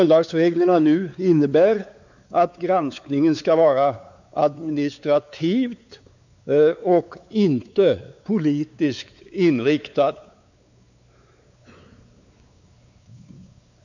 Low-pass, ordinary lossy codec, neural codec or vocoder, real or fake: 7.2 kHz; none; codec, 16 kHz, 4 kbps, X-Codec, WavLM features, trained on Multilingual LibriSpeech; fake